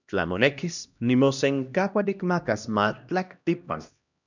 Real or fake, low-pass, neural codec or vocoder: fake; 7.2 kHz; codec, 16 kHz, 1 kbps, X-Codec, HuBERT features, trained on LibriSpeech